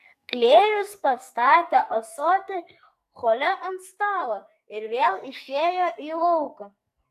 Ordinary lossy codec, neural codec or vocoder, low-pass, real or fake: AAC, 96 kbps; codec, 44.1 kHz, 2.6 kbps, SNAC; 14.4 kHz; fake